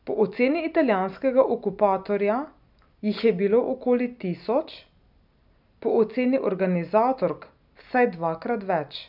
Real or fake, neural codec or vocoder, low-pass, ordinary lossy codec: real; none; 5.4 kHz; none